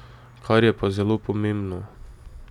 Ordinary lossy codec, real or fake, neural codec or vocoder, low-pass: none; real; none; 19.8 kHz